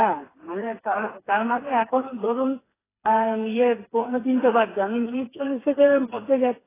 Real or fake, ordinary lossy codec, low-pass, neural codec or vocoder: fake; AAC, 16 kbps; 3.6 kHz; codec, 16 kHz, 4 kbps, FreqCodec, smaller model